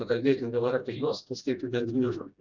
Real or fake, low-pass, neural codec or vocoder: fake; 7.2 kHz; codec, 16 kHz, 1 kbps, FreqCodec, smaller model